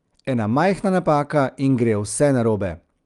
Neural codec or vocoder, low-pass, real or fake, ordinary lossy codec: none; 10.8 kHz; real; Opus, 32 kbps